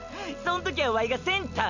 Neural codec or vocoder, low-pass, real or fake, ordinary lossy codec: none; 7.2 kHz; real; none